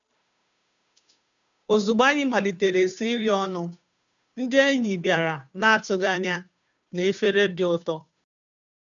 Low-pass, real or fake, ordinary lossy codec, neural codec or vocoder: 7.2 kHz; fake; none; codec, 16 kHz, 2 kbps, FunCodec, trained on Chinese and English, 25 frames a second